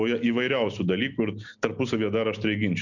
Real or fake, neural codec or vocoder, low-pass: real; none; 7.2 kHz